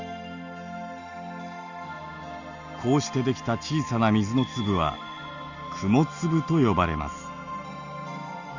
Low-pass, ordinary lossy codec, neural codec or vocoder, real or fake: 7.2 kHz; Opus, 64 kbps; none; real